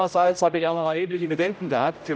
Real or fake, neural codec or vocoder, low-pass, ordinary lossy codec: fake; codec, 16 kHz, 0.5 kbps, X-Codec, HuBERT features, trained on general audio; none; none